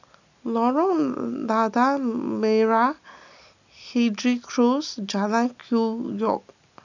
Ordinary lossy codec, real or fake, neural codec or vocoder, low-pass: none; real; none; 7.2 kHz